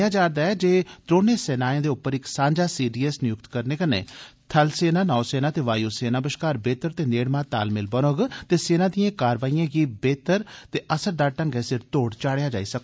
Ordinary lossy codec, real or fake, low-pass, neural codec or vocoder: none; real; none; none